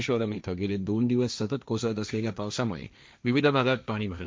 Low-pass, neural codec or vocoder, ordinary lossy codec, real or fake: none; codec, 16 kHz, 1.1 kbps, Voila-Tokenizer; none; fake